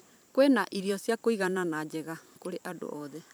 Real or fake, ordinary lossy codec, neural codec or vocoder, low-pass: fake; none; vocoder, 44.1 kHz, 128 mel bands every 512 samples, BigVGAN v2; none